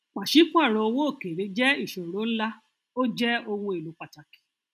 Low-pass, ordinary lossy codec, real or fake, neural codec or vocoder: 14.4 kHz; none; real; none